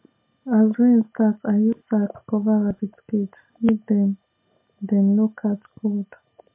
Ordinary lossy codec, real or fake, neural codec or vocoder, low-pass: MP3, 24 kbps; real; none; 3.6 kHz